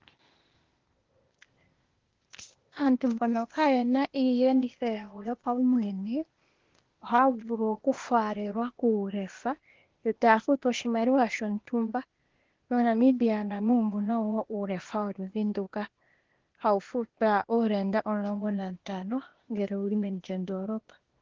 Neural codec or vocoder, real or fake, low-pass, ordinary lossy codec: codec, 16 kHz, 0.8 kbps, ZipCodec; fake; 7.2 kHz; Opus, 16 kbps